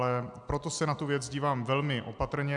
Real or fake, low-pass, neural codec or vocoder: real; 10.8 kHz; none